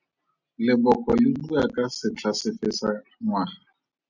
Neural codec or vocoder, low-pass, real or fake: none; 7.2 kHz; real